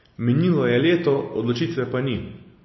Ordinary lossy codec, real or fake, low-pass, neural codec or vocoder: MP3, 24 kbps; real; 7.2 kHz; none